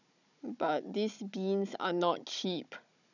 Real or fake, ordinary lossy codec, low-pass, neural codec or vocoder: fake; none; 7.2 kHz; codec, 16 kHz, 16 kbps, FunCodec, trained on Chinese and English, 50 frames a second